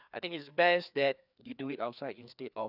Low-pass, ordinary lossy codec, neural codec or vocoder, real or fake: 5.4 kHz; none; codec, 16 kHz, 2 kbps, FreqCodec, larger model; fake